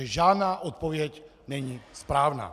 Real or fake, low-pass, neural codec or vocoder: real; 14.4 kHz; none